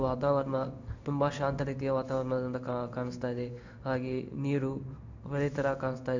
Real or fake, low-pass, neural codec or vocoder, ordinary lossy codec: fake; 7.2 kHz; codec, 16 kHz in and 24 kHz out, 1 kbps, XY-Tokenizer; none